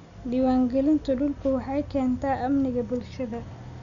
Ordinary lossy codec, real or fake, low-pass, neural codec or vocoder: none; real; 7.2 kHz; none